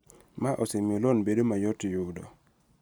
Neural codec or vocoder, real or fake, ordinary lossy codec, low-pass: none; real; none; none